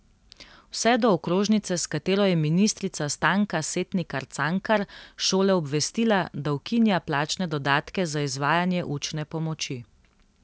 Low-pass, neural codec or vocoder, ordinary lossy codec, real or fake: none; none; none; real